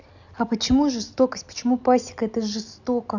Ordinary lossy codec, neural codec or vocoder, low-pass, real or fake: none; none; 7.2 kHz; real